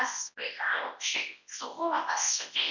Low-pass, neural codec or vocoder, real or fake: 7.2 kHz; codec, 24 kHz, 0.9 kbps, WavTokenizer, large speech release; fake